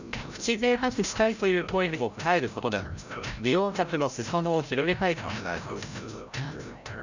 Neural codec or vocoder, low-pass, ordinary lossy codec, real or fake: codec, 16 kHz, 0.5 kbps, FreqCodec, larger model; 7.2 kHz; none; fake